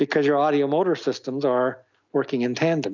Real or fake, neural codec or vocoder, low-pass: real; none; 7.2 kHz